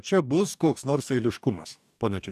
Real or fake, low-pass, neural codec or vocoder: fake; 14.4 kHz; codec, 44.1 kHz, 2.6 kbps, DAC